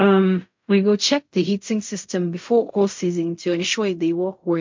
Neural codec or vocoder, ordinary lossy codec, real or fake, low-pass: codec, 16 kHz in and 24 kHz out, 0.4 kbps, LongCat-Audio-Codec, fine tuned four codebook decoder; MP3, 48 kbps; fake; 7.2 kHz